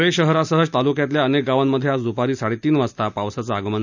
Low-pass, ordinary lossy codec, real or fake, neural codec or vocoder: 7.2 kHz; none; real; none